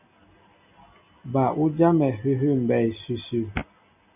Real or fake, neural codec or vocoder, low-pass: real; none; 3.6 kHz